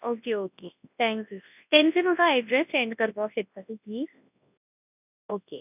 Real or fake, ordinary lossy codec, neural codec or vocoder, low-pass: fake; none; codec, 24 kHz, 0.9 kbps, WavTokenizer, large speech release; 3.6 kHz